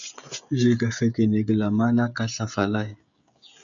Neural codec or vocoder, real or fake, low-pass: codec, 16 kHz, 16 kbps, FreqCodec, smaller model; fake; 7.2 kHz